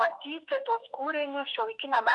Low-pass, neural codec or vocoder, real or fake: 10.8 kHz; autoencoder, 48 kHz, 32 numbers a frame, DAC-VAE, trained on Japanese speech; fake